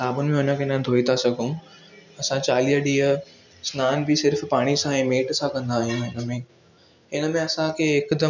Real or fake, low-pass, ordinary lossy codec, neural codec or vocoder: real; 7.2 kHz; none; none